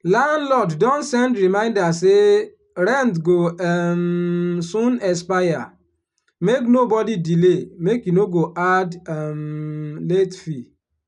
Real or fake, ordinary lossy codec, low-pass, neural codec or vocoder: real; none; 10.8 kHz; none